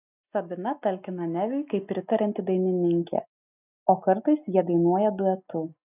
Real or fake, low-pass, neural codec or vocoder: real; 3.6 kHz; none